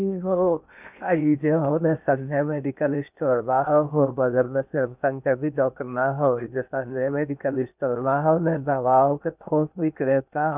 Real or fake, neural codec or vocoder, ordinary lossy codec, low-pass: fake; codec, 16 kHz in and 24 kHz out, 0.8 kbps, FocalCodec, streaming, 65536 codes; none; 3.6 kHz